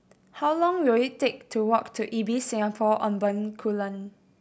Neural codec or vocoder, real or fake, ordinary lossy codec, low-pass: none; real; none; none